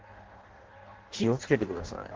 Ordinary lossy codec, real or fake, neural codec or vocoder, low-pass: Opus, 16 kbps; fake; codec, 16 kHz in and 24 kHz out, 0.6 kbps, FireRedTTS-2 codec; 7.2 kHz